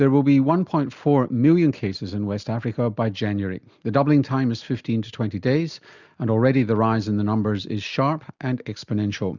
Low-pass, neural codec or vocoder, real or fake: 7.2 kHz; none; real